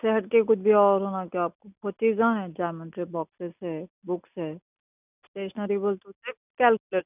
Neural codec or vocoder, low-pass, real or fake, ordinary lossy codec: none; 3.6 kHz; real; none